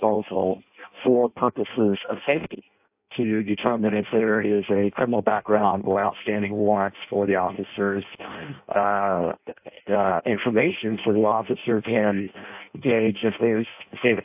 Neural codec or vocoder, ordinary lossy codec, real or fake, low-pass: codec, 16 kHz in and 24 kHz out, 0.6 kbps, FireRedTTS-2 codec; AAC, 32 kbps; fake; 3.6 kHz